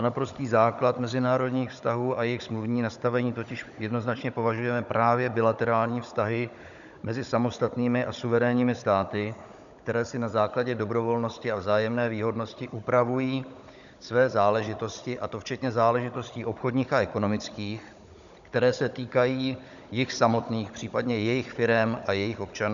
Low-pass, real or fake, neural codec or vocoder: 7.2 kHz; fake; codec, 16 kHz, 16 kbps, FunCodec, trained on Chinese and English, 50 frames a second